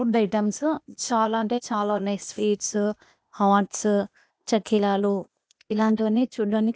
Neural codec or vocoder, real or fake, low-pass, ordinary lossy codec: codec, 16 kHz, 0.8 kbps, ZipCodec; fake; none; none